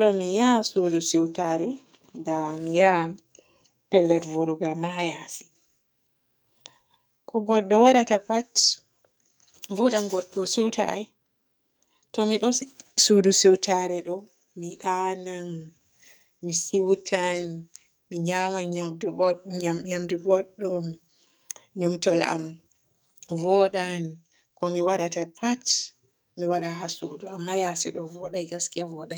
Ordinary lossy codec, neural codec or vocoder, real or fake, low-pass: none; codec, 44.1 kHz, 2.6 kbps, SNAC; fake; none